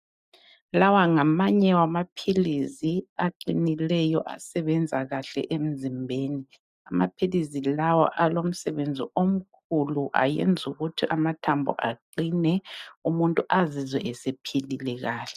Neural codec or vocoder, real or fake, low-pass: none; real; 14.4 kHz